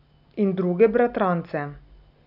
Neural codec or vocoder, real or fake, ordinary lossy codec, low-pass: none; real; none; 5.4 kHz